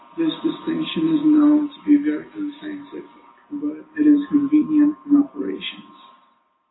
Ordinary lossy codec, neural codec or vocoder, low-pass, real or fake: AAC, 16 kbps; none; 7.2 kHz; real